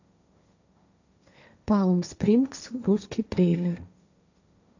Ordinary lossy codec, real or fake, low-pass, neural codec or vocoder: none; fake; 7.2 kHz; codec, 16 kHz, 1.1 kbps, Voila-Tokenizer